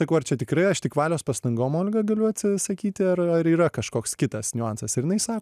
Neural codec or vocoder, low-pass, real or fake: none; 14.4 kHz; real